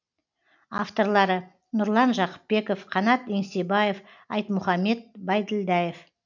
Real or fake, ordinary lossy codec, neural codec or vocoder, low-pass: real; none; none; 7.2 kHz